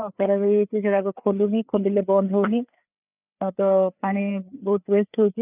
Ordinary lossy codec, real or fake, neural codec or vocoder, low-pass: none; fake; codec, 16 kHz, 4 kbps, FreqCodec, larger model; 3.6 kHz